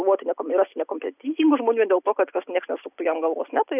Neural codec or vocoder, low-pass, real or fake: none; 3.6 kHz; real